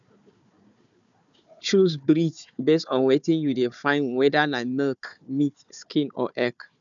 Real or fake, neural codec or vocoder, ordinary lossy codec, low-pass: fake; codec, 16 kHz, 4 kbps, FunCodec, trained on Chinese and English, 50 frames a second; none; 7.2 kHz